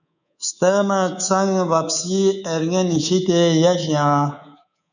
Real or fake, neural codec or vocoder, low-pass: fake; codec, 24 kHz, 3.1 kbps, DualCodec; 7.2 kHz